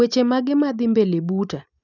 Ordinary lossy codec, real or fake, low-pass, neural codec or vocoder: none; real; 7.2 kHz; none